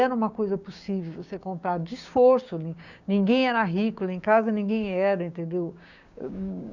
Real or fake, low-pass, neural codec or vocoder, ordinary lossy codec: fake; 7.2 kHz; codec, 16 kHz, 6 kbps, DAC; none